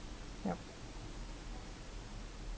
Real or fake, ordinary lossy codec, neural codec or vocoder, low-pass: real; none; none; none